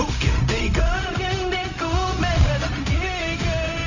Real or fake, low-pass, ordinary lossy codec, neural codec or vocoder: fake; 7.2 kHz; none; codec, 16 kHz, 0.4 kbps, LongCat-Audio-Codec